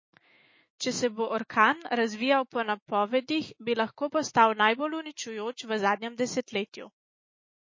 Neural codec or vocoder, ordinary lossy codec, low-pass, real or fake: autoencoder, 48 kHz, 128 numbers a frame, DAC-VAE, trained on Japanese speech; MP3, 32 kbps; 7.2 kHz; fake